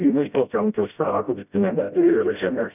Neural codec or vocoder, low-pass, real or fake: codec, 16 kHz, 0.5 kbps, FreqCodec, smaller model; 3.6 kHz; fake